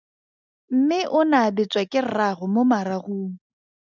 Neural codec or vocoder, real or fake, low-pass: none; real; 7.2 kHz